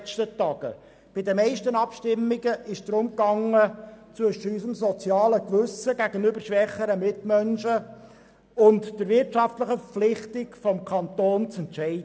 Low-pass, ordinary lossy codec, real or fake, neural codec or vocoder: none; none; real; none